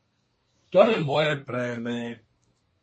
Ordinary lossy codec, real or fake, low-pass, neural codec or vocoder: MP3, 32 kbps; fake; 9.9 kHz; codec, 24 kHz, 1 kbps, SNAC